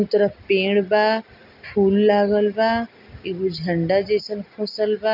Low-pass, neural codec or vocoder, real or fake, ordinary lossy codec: 5.4 kHz; none; real; none